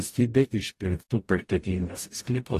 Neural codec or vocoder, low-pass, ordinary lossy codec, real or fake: codec, 44.1 kHz, 0.9 kbps, DAC; 14.4 kHz; AAC, 64 kbps; fake